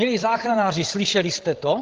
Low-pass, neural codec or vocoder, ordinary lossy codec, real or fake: 7.2 kHz; codec, 16 kHz, 16 kbps, FreqCodec, larger model; Opus, 16 kbps; fake